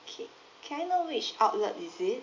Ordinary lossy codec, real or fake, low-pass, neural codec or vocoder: MP3, 48 kbps; real; 7.2 kHz; none